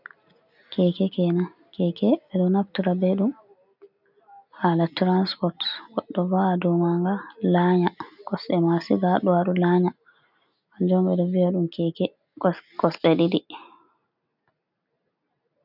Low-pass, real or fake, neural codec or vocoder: 5.4 kHz; real; none